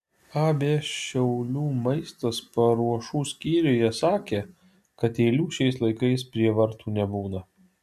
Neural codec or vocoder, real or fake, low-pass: none; real; 14.4 kHz